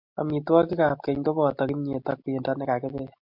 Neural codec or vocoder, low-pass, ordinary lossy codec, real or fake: none; 5.4 kHz; MP3, 48 kbps; real